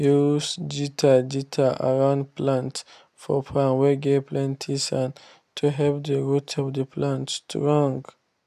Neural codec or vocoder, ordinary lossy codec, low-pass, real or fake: none; none; 14.4 kHz; real